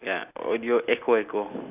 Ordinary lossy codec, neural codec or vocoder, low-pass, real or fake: none; none; 3.6 kHz; real